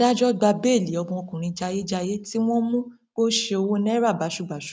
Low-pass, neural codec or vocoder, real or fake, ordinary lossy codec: none; none; real; none